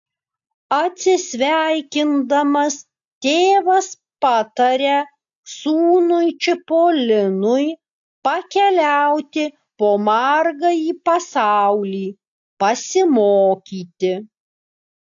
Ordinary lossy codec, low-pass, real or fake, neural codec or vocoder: AAC, 64 kbps; 7.2 kHz; real; none